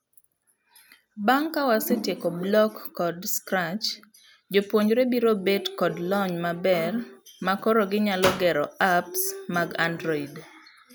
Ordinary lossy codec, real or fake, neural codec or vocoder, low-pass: none; real; none; none